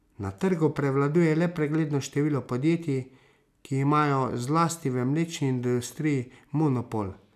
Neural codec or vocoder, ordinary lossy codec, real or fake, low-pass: none; none; real; 14.4 kHz